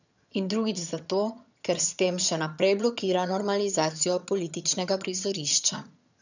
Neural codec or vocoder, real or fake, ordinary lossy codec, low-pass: vocoder, 22.05 kHz, 80 mel bands, HiFi-GAN; fake; none; 7.2 kHz